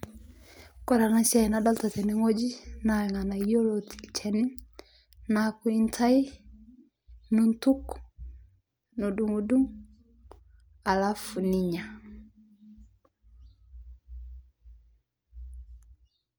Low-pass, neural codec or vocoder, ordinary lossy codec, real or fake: none; none; none; real